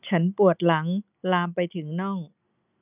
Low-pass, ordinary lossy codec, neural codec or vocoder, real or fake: 3.6 kHz; none; none; real